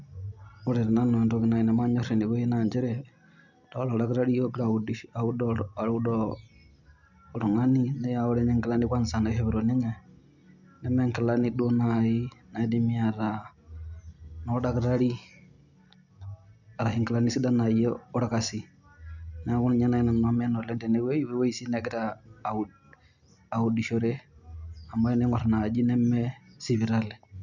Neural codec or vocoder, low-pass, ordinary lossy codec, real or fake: none; 7.2 kHz; none; real